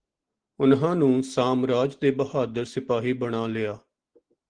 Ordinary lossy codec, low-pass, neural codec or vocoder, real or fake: Opus, 16 kbps; 9.9 kHz; none; real